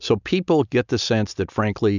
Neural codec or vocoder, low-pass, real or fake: none; 7.2 kHz; real